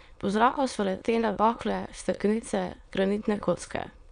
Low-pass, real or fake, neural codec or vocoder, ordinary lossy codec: 9.9 kHz; fake; autoencoder, 22.05 kHz, a latent of 192 numbers a frame, VITS, trained on many speakers; none